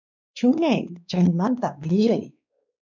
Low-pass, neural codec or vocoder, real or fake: 7.2 kHz; codec, 16 kHz, 2 kbps, X-Codec, WavLM features, trained on Multilingual LibriSpeech; fake